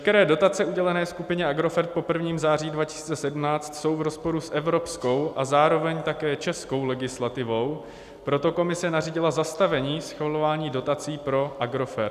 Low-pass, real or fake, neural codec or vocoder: 14.4 kHz; real; none